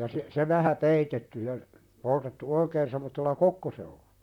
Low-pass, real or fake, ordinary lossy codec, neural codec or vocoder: 19.8 kHz; fake; none; vocoder, 44.1 kHz, 128 mel bands, Pupu-Vocoder